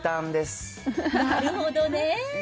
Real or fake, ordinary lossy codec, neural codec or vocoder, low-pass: real; none; none; none